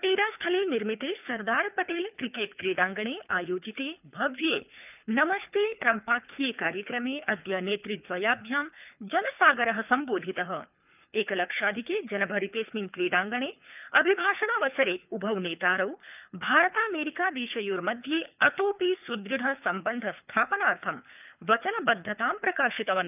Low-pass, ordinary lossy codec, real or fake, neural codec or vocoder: 3.6 kHz; none; fake; codec, 24 kHz, 3 kbps, HILCodec